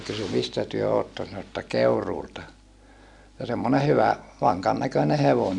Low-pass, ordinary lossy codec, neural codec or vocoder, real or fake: 10.8 kHz; none; none; real